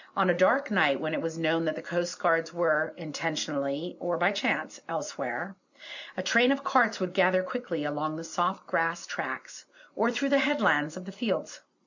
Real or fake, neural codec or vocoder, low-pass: real; none; 7.2 kHz